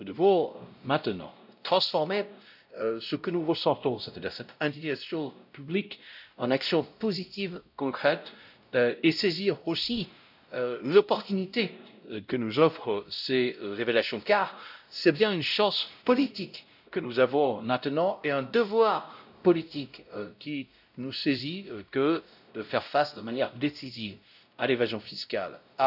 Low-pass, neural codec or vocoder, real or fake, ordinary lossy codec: 5.4 kHz; codec, 16 kHz, 0.5 kbps, X-Codec, WavLM features, trained on Multilingual LibriSpeech; fake; none